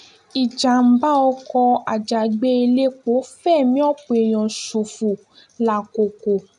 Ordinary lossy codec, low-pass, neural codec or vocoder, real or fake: none; 10.8 kHz; none; real